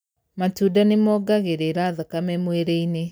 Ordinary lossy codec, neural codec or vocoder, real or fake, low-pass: none; none; real; none